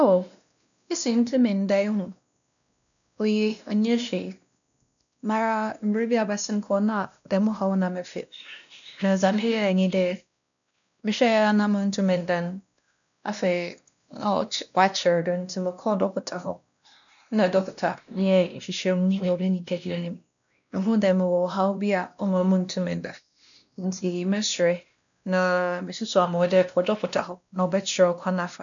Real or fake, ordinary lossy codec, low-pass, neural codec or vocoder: fake; none; 7.2 kHz; codec, 16 kHz, 1 kbps, X-Codec, WavLM features, trained on Multilingual LibriSpeech